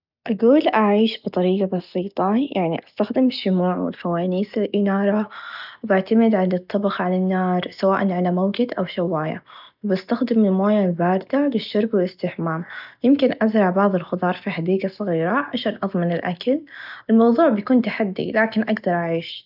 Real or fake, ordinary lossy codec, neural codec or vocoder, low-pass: real; none; none; 5.4 kHz